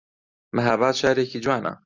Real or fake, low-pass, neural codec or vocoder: real; 7.2 kHz; none